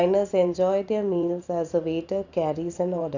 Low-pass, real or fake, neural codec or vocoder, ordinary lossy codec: 7.2 kHz; fake; vocoder, 44.1 kHz, 128 mel bands every 512 samples, BigVGAN v2; none